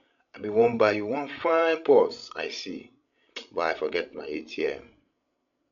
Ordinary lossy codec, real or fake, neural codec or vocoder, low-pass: none; fake; codec, 16 kHz, 16 kbps, FreqCodec, larger model; 7.2 kHz